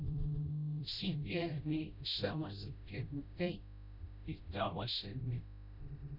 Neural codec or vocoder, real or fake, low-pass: codec, 16 kHz, 0.5 kbps, FreqCodec, smaller model; fake; 5.4 kHz